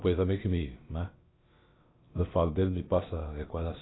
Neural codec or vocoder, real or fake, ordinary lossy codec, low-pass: codec, 16 kHz, about 1 kbps, DyCAST, with the encoder's durations; fake; AAC, 16 kbps; 7.2 kHz